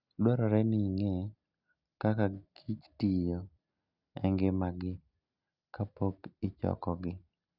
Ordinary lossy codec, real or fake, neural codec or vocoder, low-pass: Opus, 64 kbps; real; none; 5.4 kHz